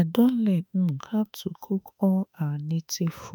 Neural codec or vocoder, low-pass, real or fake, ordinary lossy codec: autoencoder, 48 kHz, 32 numbers a frame, DAC-VAE, trained on Japanese speech; none; fake; none